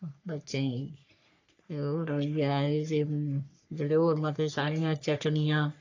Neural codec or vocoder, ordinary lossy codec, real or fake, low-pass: codec, 44.1 kHz, 3.4 kbps, Pupu-Codec; AAC, 48 kbps; fake; 7.2 kHz